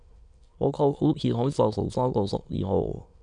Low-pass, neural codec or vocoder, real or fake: 9.9 kHz; autoencoder, 22.05 kHz, a latent of 192 numbers a frame, VITS, trained on many speakers; fake